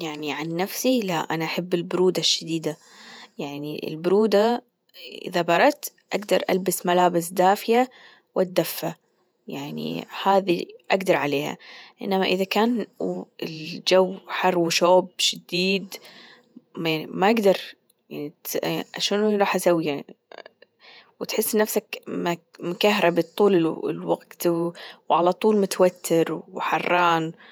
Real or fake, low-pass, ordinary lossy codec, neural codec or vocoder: fake; none; none; vocoder, 48 kHz, 128 mel bands, Vocos